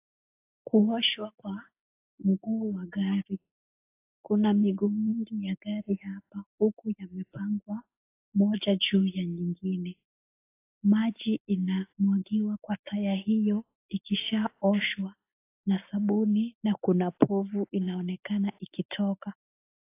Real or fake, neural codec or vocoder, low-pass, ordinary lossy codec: real; none; 3.6 kHz; AAC, 24 kbps